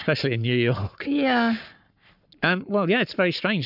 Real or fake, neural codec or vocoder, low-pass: fake; codec, 44.1 kHz, 7.8 kbps, Pupu-Codec; 5.4 kHz